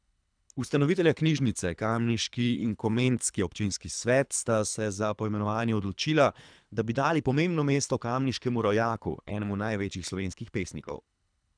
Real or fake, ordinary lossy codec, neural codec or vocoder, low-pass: fake; none; codec, 24 kHz, 3 kbps, HILCodec; 9.9 kHz